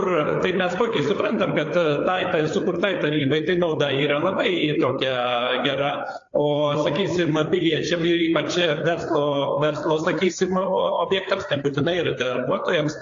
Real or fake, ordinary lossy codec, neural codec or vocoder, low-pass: fake; AAC, 48 kbps; codec, 16 kHz, 4 kbps, FreqCodec, larger model; 7.2 kHz